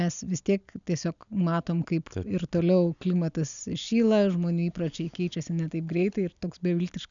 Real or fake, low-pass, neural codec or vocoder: real; 7.2 kHz; none